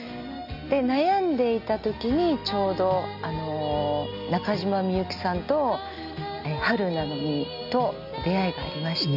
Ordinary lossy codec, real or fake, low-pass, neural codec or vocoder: none; real; 5.4 kHz; none